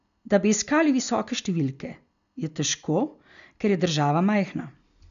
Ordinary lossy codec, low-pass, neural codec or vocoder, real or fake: none; 7.2 kHz; none; real